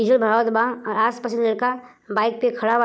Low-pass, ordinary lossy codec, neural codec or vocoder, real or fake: none; none; none; real